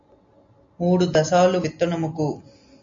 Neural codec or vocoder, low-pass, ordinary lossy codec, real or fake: none; 7.2 kHz; MP3, 48 kbps; real